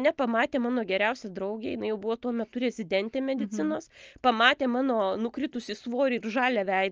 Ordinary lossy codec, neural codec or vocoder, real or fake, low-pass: Opus, 24 kbps; none; real; 7.2 kHz